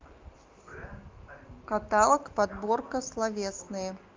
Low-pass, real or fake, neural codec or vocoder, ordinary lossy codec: 7.2 kHz; real; none; Opus, 24 kbps